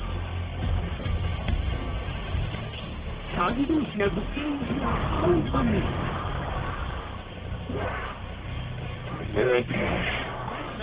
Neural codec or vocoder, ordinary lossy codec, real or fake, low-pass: codec, 44.1 kHz, 1.7 kbps, Pupu-Codec; Opus, 16 kbps; fake; 3.6 kHz